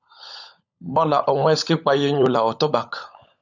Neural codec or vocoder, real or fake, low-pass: codec, 16 kHz, 16 kbps, FunCodec, trained on LibriTTS, 50 frames a second; fake; 7.2 kHz